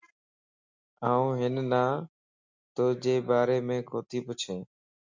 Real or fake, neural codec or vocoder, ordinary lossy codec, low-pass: real; none; MP3, 64 kbps; 7.2 kHz